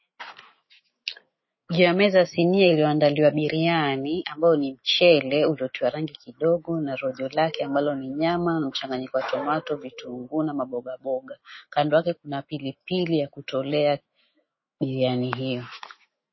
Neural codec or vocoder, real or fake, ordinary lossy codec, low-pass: autoencoder, 48 kHz, 128 numbers a frame, DAC-VAE, trained on Japanese speech; fake; MP3, 24 kbps; 7.2 kHz